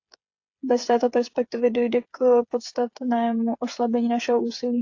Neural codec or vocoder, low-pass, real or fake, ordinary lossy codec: codec, 16 kHz, 8 kbps, FreqCodec, smaller model; 7.2 kHz; fake; AAC, 48 kbps